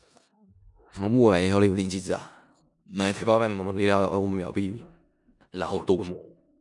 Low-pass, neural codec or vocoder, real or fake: 10.8 kHz; codec, 16 kHz in and 24 kHz out, 0.4 kbps, LongCat-Audio-Codec, four codebook decoder; fake